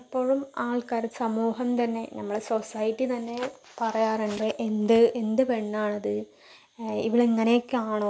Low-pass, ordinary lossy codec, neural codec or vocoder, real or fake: none; none; none; real